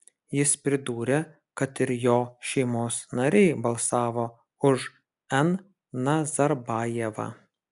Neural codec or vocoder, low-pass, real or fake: none; 10.8 kHz; real